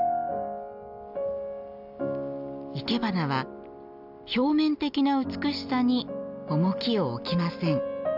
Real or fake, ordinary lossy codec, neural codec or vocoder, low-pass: real; none; none; 5.4 kHz